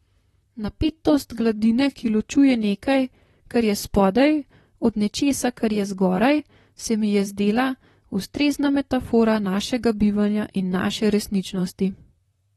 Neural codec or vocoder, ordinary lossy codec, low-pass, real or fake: vocoder, 44.1 kHz, 128 mel bands, Pupu-Vocoder; AAC, 32 kbps; 19.8 kHz; fake